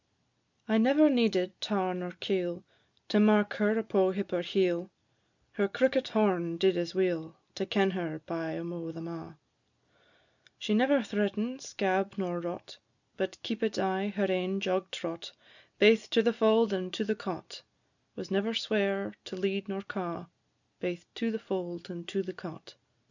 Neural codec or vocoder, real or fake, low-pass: none; real; 7.2 kHz